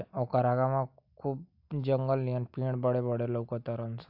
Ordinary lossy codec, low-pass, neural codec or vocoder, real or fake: AAC, 48 kbps; 5.4 kHz; none; real